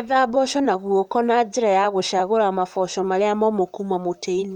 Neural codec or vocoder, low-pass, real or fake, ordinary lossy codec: vocoder, 44.1 kHz, 128 mel bands, Pupu-Vocoder; 19.8 kHz; fake; none